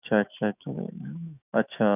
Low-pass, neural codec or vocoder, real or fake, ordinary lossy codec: 3.6 kHz; autoencoder, 48 kHz, 128 numbers a frame, DAC-VAE, trained on Japanese speech; fake; none